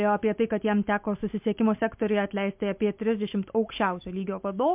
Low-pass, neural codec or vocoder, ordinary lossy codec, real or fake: 3.6 kHz; none; MP3, 32 kbps; real